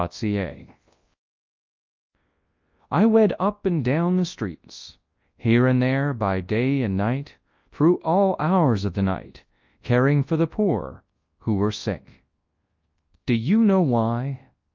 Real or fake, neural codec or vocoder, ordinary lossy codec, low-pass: fake; codec, 24 kHz, 0.9 kbps, WavTokenizer, large speech release; Opus, 24 kbps; 7.2 kHz